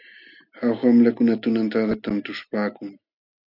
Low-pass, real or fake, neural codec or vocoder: 5.4 kHz; real; none